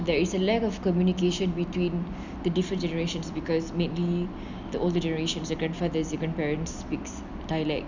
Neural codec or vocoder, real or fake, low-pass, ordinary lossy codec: none; real; 7.2 kHz; none